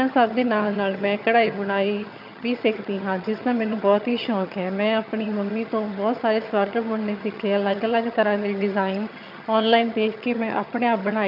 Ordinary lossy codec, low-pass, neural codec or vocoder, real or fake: none; 5.4 kHz; vocoder, 22.05 kHz, 80 mel bands, HiFi-GAN; fake